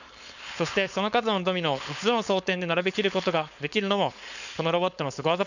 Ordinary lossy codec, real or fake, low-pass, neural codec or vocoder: none; fake; 7.2 kHz; codec, 16 kHz, 4.8 kbps, FACodec